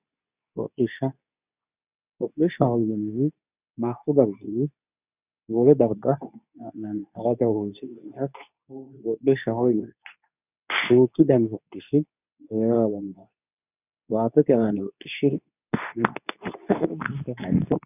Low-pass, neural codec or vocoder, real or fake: 3.6 kHz; codec, 24 kHz, 0.9 kbps, WavTokenizer, medium speech release version 2; fake